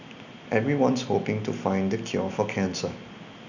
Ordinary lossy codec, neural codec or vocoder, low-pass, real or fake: none; none; 7.2 kHz; real